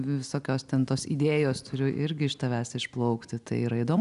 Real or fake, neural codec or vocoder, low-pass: real; none; 10.8 kHz